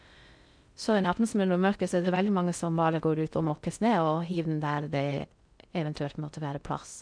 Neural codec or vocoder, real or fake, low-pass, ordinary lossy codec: codec, 16 kHz in and 24 kHz out, 0.6 kbps, FocalCodec, streaming, 2048 codes; fake; 9.9 kHz; MP3, 96 kbps